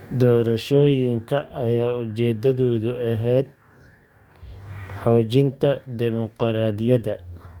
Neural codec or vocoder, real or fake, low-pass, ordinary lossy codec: codec, 44.1 kHz, 2.6 kbps, DAC; fake; 19.8 kHz; none